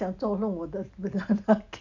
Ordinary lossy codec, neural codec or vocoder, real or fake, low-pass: none; none; real; 7.2 kHz